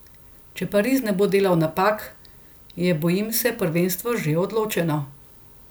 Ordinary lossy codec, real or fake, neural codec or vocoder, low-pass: none; real; none; none